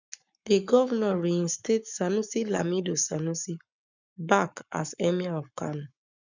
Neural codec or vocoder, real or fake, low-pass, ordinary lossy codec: codec, 44.1 kHz, 7.8 kbps, Pupu-Codec; fake; 7.2 kHz; none